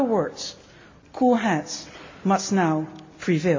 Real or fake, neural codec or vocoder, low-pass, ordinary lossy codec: real; none; 7.2 kHz; MP3, 48 kbps